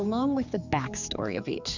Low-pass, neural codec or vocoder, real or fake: 7.2 kHz; codec, 16 kHz, 4 kbps, X-Codec, HuBERT features, trained on general audio; fake